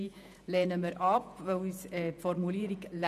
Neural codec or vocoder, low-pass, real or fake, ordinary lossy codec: vocoder, 48 kHz, 128 mel bands, Vocos; 14.4 kHz; fake; none